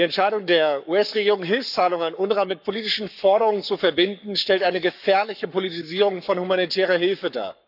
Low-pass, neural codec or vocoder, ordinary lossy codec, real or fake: 5.4 kHz; codec, 44.1 kHz, 7.8 kbps, Pupu-Codec; none; fake